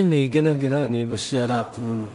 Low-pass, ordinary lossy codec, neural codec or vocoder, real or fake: 10.8 kHz; none; codec, 16 kHz in and 24 kHz out, 0.4 kbps, LongCat-Audio-Codec, two codebook decoder; fake